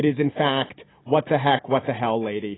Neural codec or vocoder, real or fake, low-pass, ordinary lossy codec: none; real; 7.2 kHz; AAC, 16 kbps